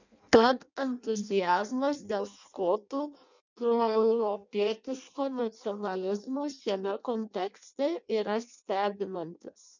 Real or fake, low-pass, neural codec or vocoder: fake; 7.2 kHz; codec, 16 kHz in and 24 kHz out, 0.6 kbps, FireRedTTS-2 codec